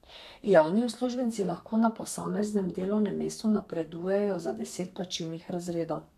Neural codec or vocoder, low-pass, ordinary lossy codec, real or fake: codec, 32 kHz, 1.9 kbps, SNAC; 14.4 kHz; none; fake